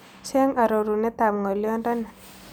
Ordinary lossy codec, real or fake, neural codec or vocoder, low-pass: none; real; none; none